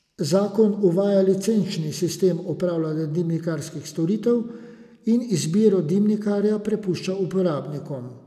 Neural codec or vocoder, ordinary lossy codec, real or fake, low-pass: none; none; real; 14.4 kHz